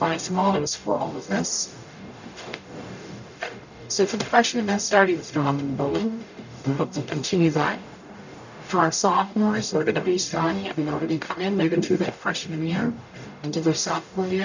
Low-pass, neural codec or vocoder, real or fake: 7.2 kHz; codec, 44.1 kHz, 0.9 kbps, DAC; fake